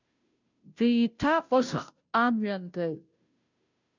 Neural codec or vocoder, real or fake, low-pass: codec, 16 kHz, 0.5 kbps, FunCodec, trained on Chinese and English, 25 frames a second; fake; 7.2 kHz